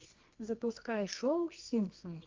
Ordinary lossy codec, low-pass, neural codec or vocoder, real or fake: Opus, 32 kbps; 7.2 kHz; codec, 24 kHz, 0.9 kbps, WavTokenizer, medium music audio release; fake